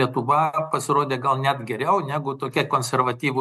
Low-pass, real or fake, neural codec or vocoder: 14.4 kHz; real; none